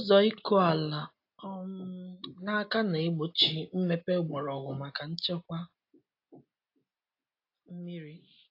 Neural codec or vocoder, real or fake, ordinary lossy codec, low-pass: none; real; AAC, 32 kbps; 5.4 kHz